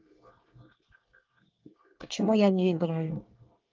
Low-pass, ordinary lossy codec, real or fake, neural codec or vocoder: 7.2 kHz; Opus, 24 kbps; fake; codec, 24 kHz, 1 kbps, SNAC